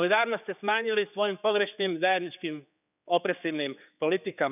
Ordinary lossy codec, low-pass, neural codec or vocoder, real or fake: none; 3.6 kHz; codec, 16 kHz, 4 kbps, X-Codec, HuBERT features, trained on general audio; fake